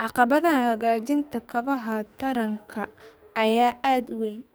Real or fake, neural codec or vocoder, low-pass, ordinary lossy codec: fake; codec, 44.1 kHz, 2.6 kbps, SNAC; none; none